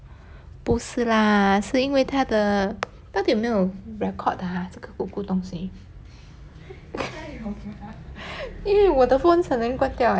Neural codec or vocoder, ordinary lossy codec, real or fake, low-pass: none; none; real; none